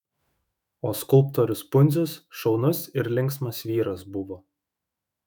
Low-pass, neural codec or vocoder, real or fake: 19.8 kHz; autoencoder, 48 kHz, 128 numbers a frame, DAC-VAE, trained on Japanese speech; fake